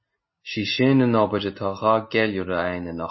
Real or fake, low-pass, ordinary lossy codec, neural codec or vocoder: real; 7.2 kHz; MP3, 24 kbps; none